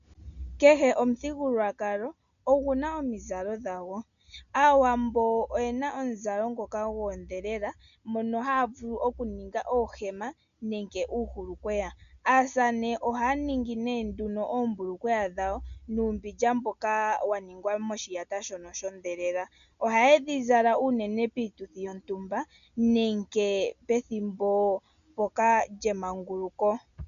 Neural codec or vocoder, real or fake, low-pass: none; real; 7.2 kHz